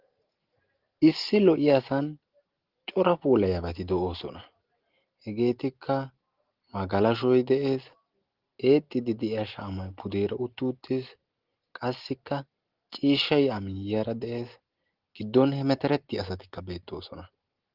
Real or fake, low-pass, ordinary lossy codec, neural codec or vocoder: real; 5.4 kHz; Opus, 16 kbps; none